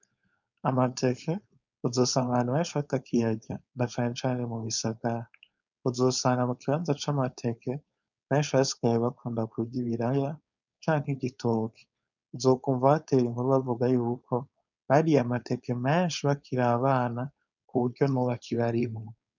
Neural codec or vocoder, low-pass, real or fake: codec, 16 kHz, 4.8 kbps, FACodec; 7.2 kHz; fake